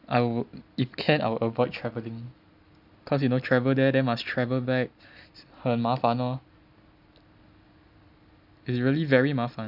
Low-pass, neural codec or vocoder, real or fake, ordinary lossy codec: 5.4 kHz; none; real; none